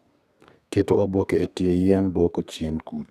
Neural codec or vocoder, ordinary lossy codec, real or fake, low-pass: codec, 32 kHz, 1.9 kbps, SNAC; none; fake; 14.4 kHz